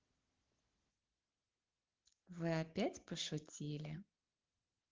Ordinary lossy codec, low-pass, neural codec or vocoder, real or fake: Opus, 16 kbps; 7.2 kHz; none; real